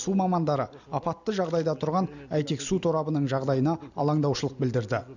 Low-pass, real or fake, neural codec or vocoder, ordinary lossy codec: 7.2 kHz; real; none; none